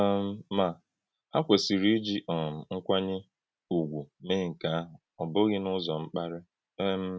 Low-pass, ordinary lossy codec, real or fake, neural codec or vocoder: none; none; real; none